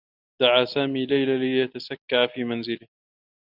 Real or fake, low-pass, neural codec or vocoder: real; 5.4 kHz; none